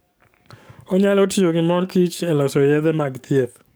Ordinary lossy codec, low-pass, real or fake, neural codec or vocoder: none; none; fake; codec, 44.1 kHz, 7.8 kbps, DAC